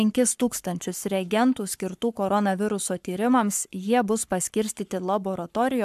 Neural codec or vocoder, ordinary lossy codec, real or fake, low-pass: codec, 44.1 kHz, 7.8 kbps, Pupu-Codec; MP3, 96 kbps; fake; 14.4 kHz